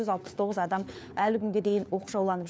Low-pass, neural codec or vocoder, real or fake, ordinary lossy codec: none; codec, 16 kHz, 4 kbps, FunCodec, trained on LibriTTS, 50 frames a second; fake; none